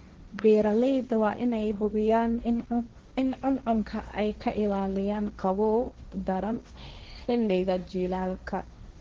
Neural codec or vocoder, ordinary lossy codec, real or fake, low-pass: codec, 16 kHz, 1.1 kbps, Voila-Tokenizer; Opus, 16 kbps; fake; 7.2 kHz